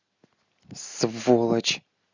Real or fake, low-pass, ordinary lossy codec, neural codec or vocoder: real; 7.2 kHz; Opus, 64 kbps; none